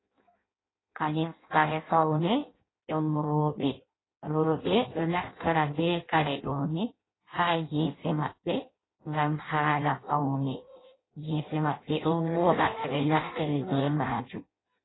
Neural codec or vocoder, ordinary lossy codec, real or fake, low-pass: codec, 16 kHz in and 24 kHz out, 0.6 kbps, FireRedTTS-2 codec; AAC, 16 kbps; fake; 7.2 kHz